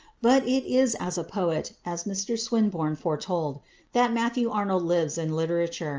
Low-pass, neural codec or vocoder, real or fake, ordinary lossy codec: 7.2 kHz; none; real; Opus, 24 kbps